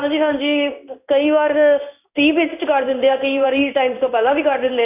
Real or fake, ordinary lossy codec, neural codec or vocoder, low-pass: fake; none; codec, 16 kHz in and 24 kHz out, 1 kbps, XY-Tokenizer; 3.6 kHz